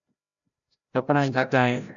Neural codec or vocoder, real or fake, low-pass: codec, 16 kHz, 0.5 kbps, FreqCodec, larger model; fake; 7.2 kHz